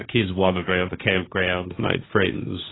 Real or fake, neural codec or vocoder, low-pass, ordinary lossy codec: fake; codec, 16 kHz, 1.1 kbps, Voila-Tokenizer; 7.2 kHz; AAC, 16 kbps